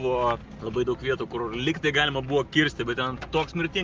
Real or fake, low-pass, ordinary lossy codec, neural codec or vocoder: real; 7.2 kHz; Opus, 24 kbps; none